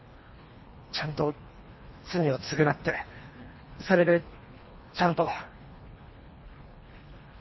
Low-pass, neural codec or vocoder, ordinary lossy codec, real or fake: 7.2 kHz; codec, 24 kHz, 1.5 kbps, HILCodec; MP3, 24 kbps; fake